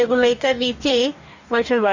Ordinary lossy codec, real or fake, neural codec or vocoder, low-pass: none; fake; codec, 44.1 kHz, 2.6 kbps, DAC; 7.2 kHz